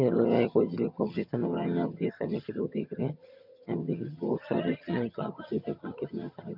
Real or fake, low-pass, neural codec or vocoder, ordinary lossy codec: fake; 5.4 kHz; vocoder, 22.05 kHz, 80 mel bands, HiFi-GAN; none